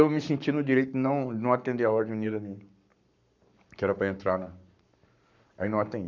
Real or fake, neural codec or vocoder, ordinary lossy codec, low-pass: fake; codec, 44.1 kHz, 7.8 kbps, Pupu-Codec; none; 7.2 kHz